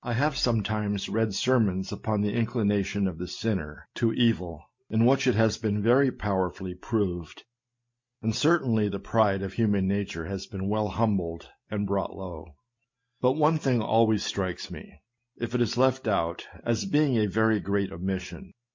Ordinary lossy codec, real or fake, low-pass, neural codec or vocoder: MP3, 48 kbps; real; 7.2 kHz; none